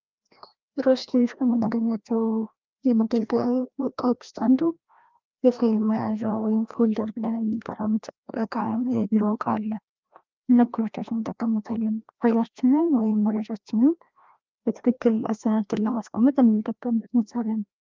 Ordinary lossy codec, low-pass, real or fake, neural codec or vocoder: Opus, 24 kbps; 7.2 kHz; fake; codec, 16 kHz, 1 kbps, FreqCodec, larger model